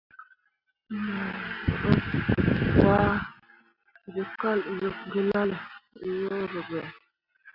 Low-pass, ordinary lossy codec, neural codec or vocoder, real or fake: 5.4 kHz; Opus, 64 kbps; none; real